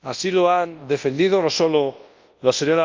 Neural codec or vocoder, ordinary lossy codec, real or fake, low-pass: codec, 24 kHz, 0.9 kbps, WavTokenizer, large speech release; Opus, 32 kbps; fake; 7.2 kHz